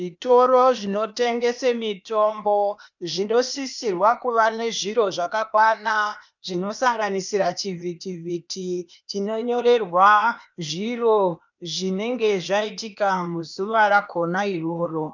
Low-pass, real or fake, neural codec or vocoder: 7.2 kHz; fake; codec, 16 kHz, 0.8 kbps, ZipCodec